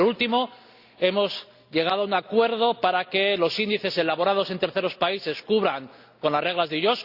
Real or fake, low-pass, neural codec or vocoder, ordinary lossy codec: real; 5.4 kHz; none; Opus, 64 kbps